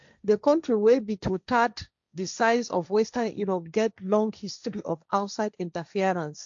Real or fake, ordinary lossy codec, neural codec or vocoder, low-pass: fake; AAC, 64 kbps; codec, 16 kHz, 1.1 kbps, Voila-Tokenizer; 7.2 kHz